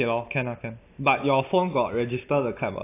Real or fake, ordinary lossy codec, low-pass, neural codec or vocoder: real; AAC, 24 kbps; 3.6 kHz; none